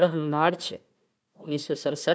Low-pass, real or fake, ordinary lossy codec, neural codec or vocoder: none; fake; none; codec, 16 kHz, 1 kbps, FunCodec, trained on Chinese and English, 50 frames a second